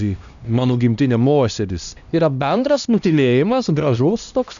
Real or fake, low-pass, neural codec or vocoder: fake; 7.2 kHz; codec, 16 kHz, 1 kbps, X-Codec, HuBERT features, trained on LibriSpeech